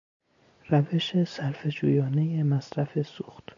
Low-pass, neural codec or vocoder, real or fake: 7.2 kHz; none; real